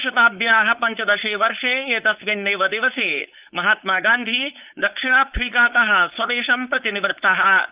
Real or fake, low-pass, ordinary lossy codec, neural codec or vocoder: fake; 3.6 kHz; Opus, 24 kbps; codec, 16 kHz, 4.8 kbps, FACodec